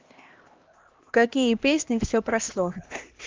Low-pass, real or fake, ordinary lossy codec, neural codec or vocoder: 7.2 kHz; fake; Opus, 32 kbps; codec, 16 kHz, 1 kbps, X-Codec, HuBERT features, trained on LibriSpeech